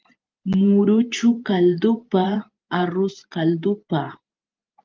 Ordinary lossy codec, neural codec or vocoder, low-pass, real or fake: Opus, 32 kbps; vocoder, 44.1 kHz, 128 mel bands every 512 samples, BigVGAN v2; 7.2 kHz; fake